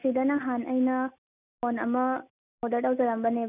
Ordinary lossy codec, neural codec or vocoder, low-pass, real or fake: none; none; 3.6 kHz; real